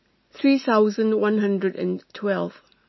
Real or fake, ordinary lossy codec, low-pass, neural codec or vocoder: real; MP3, 24 kbps; 7.2 kHz; none